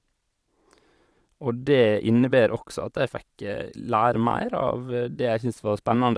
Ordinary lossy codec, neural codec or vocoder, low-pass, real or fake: none; vocoder, 22.05 kHz, 80 mel bands, Vocos; none; fake